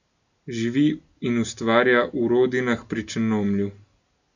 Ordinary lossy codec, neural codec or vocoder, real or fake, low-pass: none; none; real; 7.2 kHz